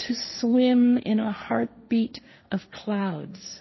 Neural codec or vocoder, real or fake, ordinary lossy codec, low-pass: codec, 16 kHz, 1.1 kbps, Voila-Tokenizer; fake; MP3, 24 kbps; 7.2 kHz